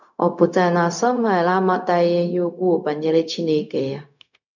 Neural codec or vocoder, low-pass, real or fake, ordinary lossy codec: codec, 16 kHz, 0.4 kbps, LongCat-Audio-Codec; 7.2 kHz; fake; none